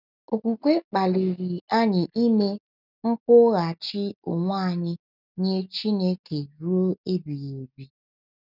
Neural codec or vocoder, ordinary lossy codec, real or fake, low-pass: none; none; real; 5.4 kHz